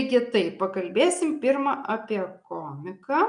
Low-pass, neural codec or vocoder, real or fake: 9.9 kHz; none; real